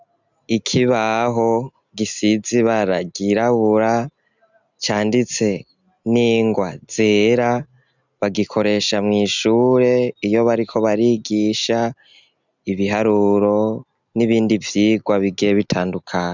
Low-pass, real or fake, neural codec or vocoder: 7.2 kHz; real; none